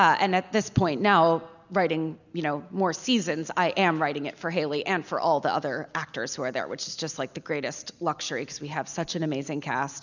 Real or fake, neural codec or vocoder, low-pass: real; none; 7.2 kHz